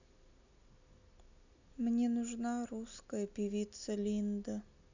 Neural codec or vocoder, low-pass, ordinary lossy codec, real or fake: none; 7.2 kHz; none; real